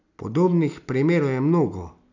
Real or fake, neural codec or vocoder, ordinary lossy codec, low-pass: real; none; none; 7.2 kHz